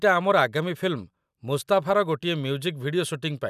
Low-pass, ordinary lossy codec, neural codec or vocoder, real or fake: 14.4 kHz; none; none; real